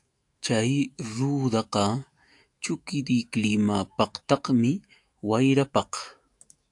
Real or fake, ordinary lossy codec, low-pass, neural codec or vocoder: fake; AAC, 64 kbps; 10.8 kHz; autoencoder, 48 kHz, 128 numbers a frame, DAC-VAE, trained on Japanese speech